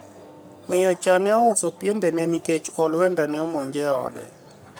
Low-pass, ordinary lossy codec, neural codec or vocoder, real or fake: none; none; codec, 44.1 kHz, 3.4 kbps, Pupu-Codec; fake